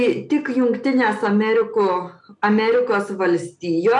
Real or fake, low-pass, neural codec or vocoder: real; 10.8 kHz; none